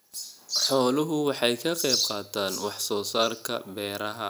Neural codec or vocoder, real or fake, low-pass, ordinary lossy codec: none; real; none; none